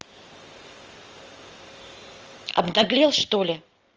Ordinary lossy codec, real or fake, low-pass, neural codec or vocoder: Opus, 24 kbps; real; 7.2 kHz; none